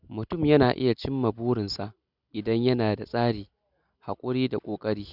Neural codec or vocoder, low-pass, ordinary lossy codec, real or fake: none; 5.4 kHz; none; real